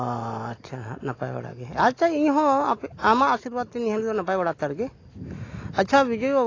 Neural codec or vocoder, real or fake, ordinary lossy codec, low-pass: none; real; AAC, 32 kbps; 7.2 kHz